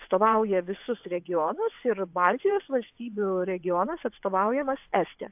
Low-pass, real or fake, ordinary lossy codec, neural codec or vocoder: 3.6 kHz; fake; AAC, 32 kbps; vocoder, 22.05 kHz, 80 mel bands, WaveNeXt